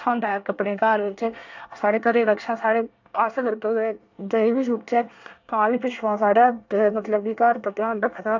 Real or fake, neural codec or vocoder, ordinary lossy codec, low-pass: fake; codec, 24 kHz, 1 kbps, SNAC; AAC, 48 kbps; 7.2 kHz